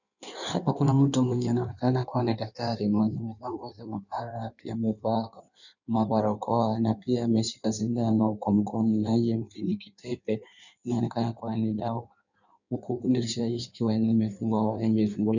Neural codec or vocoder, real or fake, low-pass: codec, 16 kHz in and 24 kHz out, 1.1 kbps, FireRedTTS-2 codec; fake; 7.2 kHz